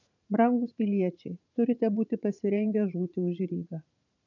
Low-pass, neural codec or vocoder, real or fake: 7.2 kHz; vocoder, 44.1 kHz, 80 mel bands, Vocos; fake